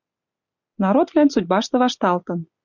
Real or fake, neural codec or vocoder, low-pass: real; none; 7.2 kHz